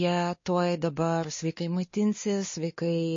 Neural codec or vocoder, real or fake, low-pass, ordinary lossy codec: codec, 16 kHz, 2 kbps, X-Codec, WavLM features, trained on Multilingual LibriSpeech; fake; 7.2 kHz; MP3, 32 kbps